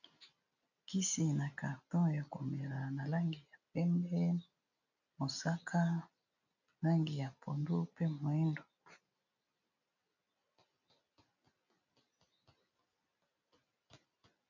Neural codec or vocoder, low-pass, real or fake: none; 7.2 kHz; real